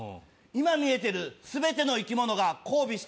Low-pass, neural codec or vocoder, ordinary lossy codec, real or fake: none; none; none; real